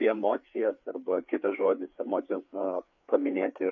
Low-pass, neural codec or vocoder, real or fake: 7.2 kHz; codec, 16 kHz, 4 kbps, FreqCodec, larger model; fake